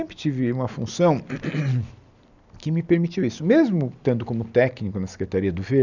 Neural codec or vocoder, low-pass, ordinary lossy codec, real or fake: none; 7.2 kHz; none; real